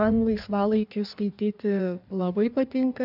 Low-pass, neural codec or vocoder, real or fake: 5.4 kHz; codec, 16 kHz in and 24 kHz out, 1.1 kbps, FireRedTTS-2 codec; fake